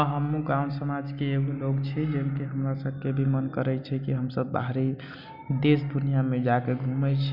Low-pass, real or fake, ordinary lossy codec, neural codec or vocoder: 5.4 kHz; real; none; none